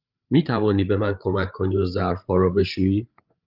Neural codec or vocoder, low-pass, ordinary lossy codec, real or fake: codec, 16 kHz, 8 kbps, FreqCodec, larger model; 5.4 kHz; Opus, 32 kbps; fake